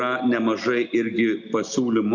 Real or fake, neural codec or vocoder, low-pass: real; none; 7.2 kHz